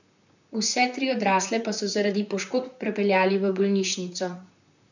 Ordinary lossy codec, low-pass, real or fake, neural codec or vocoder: none; 7.2 kHz; fake; vocoder, 44.1 kHz, 128 mel bands, Pupu-Vocoder